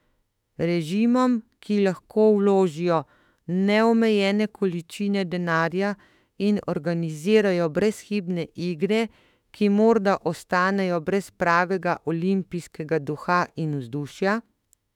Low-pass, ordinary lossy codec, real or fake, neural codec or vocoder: 19.8 kHz; none; fake; autoencoder, 48 kHz, 32 numbers a frame, DAC-VAE, trained on Japanese speech